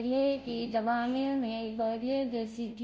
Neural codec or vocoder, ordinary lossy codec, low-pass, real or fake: codec, 16 kHz, 0.5 kbps, FunCodec, trained on Chinese and English, 25 frames a second; none; none; fake